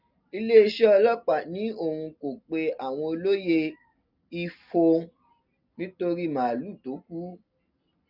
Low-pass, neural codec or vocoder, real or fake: 5.4 kHz; none; real